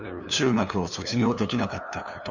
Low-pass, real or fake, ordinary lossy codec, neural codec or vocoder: 7.2 kHz; fake; none; codec, 16 kHz, 2 kbps, FunCodec, trained on LibriTTS, 25 frames a second